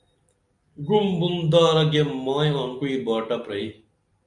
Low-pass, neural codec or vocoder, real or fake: 10.8 kHz; none; real